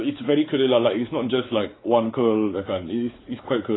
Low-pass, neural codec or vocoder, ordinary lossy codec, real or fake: 7.2 kHz; codec, 16 kHz, 4 kbps, X-Codec, WavLM features, trained on Multilingual LibriSpeech; AAC, 16 kbps; fake